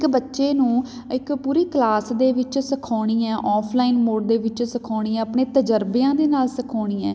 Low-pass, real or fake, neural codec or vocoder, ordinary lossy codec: none; real; none; none